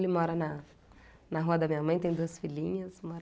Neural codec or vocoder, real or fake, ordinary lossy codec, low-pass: none; real; none; none